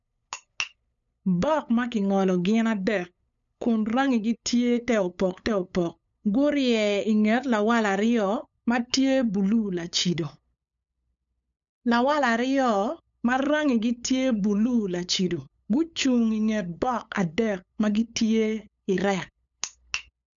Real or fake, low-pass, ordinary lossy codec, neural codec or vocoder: fake; 7.2 kHz; none; codec, 16 kHz, 8 kbps, FunCodec, trained on LibriTTS, 25 frames a second